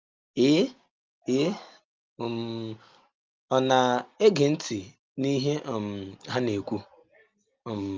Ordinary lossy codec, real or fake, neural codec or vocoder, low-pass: Opus, 32 kbps; real; none; 7.2 kHz